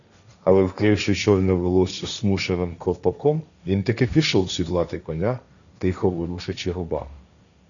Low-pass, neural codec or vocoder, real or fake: 7.2 kHz; codec, 16 kHz, 1.1 kbps, Voila-Tokenizer; fake